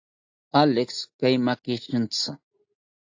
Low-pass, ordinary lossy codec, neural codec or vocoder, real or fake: 7.2 kHz; AAC, 48 kbps; none; real